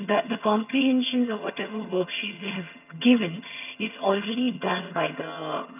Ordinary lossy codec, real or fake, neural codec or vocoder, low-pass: none; fake; vocoder, 22.05 kHz, 80 mel bands, HiFi-GAN; 3.6 kHz